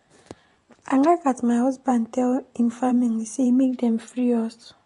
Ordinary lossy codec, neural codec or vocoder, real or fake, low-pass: AAC, 48 kbps; vocoder, 24 kHz, 100 mel bands, Vocos; fake; 10.8 kHz